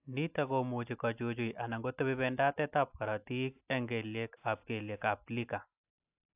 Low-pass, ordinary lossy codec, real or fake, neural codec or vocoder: 3.6 kHz; AAC, 32 kbps; real; none